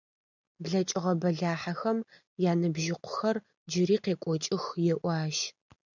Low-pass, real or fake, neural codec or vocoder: 7.2 kHz; real; none